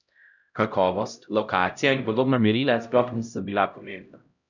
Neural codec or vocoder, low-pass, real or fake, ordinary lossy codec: codec, 16 kHz, 0.5 kbps, X-Codec, HuBERT features, trained on LibriSpeech; 7.2 kHz; fake; none